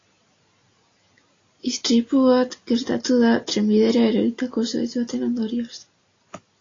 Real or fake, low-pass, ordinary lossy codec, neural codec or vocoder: real; 7.2 kHz; AAC, 32 kbps; none